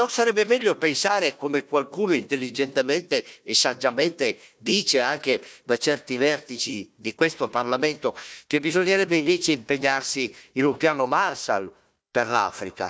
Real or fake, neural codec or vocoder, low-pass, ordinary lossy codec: fake; codec, 16 kHz, 1 kbps, FunCodec, trained on Chinese and English, 50 frames a second; none; none